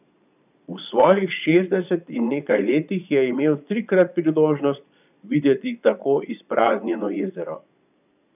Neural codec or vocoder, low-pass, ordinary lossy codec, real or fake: vocoder, 22.05 kHz, 80 mel bands, Vocos; 3.6 kHz; none; fake